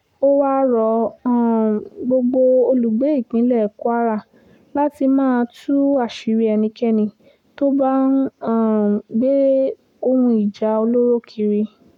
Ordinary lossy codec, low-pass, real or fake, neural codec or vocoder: none; 19.8 kHz; fake; codec, 44.1 kHz, 7.8 kbps, Pupu-Codec